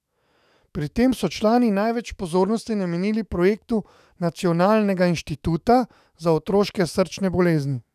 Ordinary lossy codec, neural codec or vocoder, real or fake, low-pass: none; autoencoder, 48 kHz, 128 numbers a frame, DAC-VAE, trained on Japanese speech; fake; 14.4 kHz